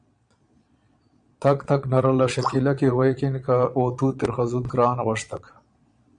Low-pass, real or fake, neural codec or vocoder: 9.9 kHz; fake; vocoder, 22.05 kHz, 80 mel bands, Vocos